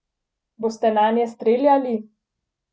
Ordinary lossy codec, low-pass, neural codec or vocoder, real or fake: none; none; none; real